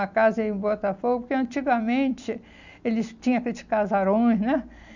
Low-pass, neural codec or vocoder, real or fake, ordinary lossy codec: 7.2 kHz; none; real; none